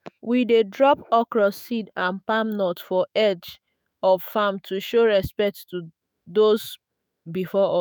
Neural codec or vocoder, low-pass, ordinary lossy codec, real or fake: autoencoder, 48 kHz, 128 numbers a frame, DAC-VAE, trained on Japanese speech; none; none; fake